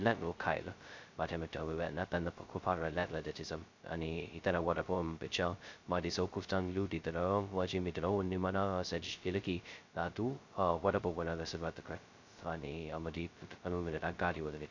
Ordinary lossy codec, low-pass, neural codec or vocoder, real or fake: MP3, 64 kbps; 7.2 kHz; codec, 16 kHz, 0.2 kbps, FocalCodec; fake